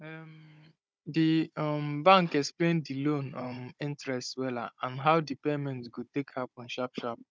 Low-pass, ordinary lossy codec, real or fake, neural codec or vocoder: none; none; fake; codec, 16 kHz, 16 kbps, FunCodec, trained on Chinese and English, 50 frames a second